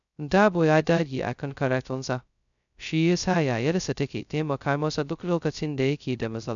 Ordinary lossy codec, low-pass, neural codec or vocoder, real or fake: none; 7.2 kHz; codec, 16 kHz, 0.2 kbps, FocalCodec; fake